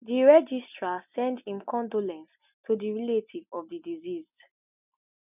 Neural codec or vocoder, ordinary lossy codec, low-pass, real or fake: none; none; 3.6 kHz; real